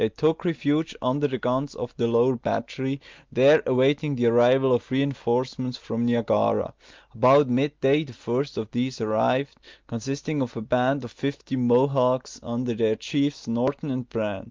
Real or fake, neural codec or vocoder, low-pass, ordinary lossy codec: real; none; 7.2 kHz; Opus, 24 kbps